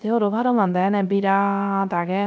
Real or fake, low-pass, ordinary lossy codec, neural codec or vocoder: fake; none; none; codec, 16 kHz, 0.3 kbps, FocalCodec